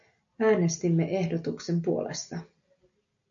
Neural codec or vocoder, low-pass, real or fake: none; 7.2 kHz; real